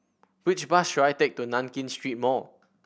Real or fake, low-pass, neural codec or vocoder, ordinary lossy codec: real; none; none; none